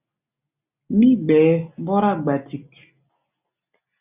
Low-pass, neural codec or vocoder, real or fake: 3.6 kHz; none; real